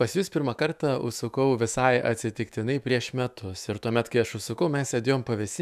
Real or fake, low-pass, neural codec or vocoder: fake; 14.4 kHz; autoencoder, 48 kHz, 128 numbers a frame, DAC-VAE, trained on Japanese speech